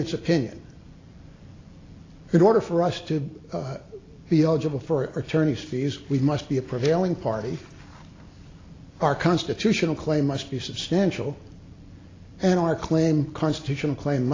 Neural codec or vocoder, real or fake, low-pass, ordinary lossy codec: none; real; 7.2 kHz; AAC, 32 kbps